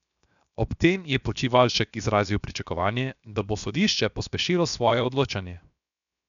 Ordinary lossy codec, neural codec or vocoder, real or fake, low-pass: none; codec, 16 kHz, 0.7 kbps, FocalCodec; fake; 7.2 kHz